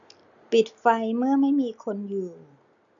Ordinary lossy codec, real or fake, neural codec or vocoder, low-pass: none; real; none; 7.2 kHz